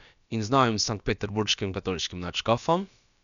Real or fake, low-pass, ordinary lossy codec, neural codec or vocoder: fake; 7.2 kHz; none; codec, 16 kHz, about 1 kbps, DyCAST, with the encoder's durations